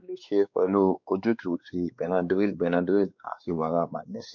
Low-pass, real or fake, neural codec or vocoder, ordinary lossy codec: 7.2 kHz; fake; codec, 16 kHz, 2 kbps, X-Codec, WavLM features, trained on Multilingual LibriSpeech; none